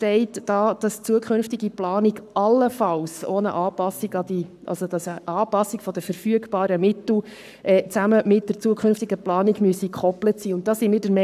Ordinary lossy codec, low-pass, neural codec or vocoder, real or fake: none; 14.4 kHz; codec, 44.1 kHz, 7.8 kbps, Pupu-Codec; fake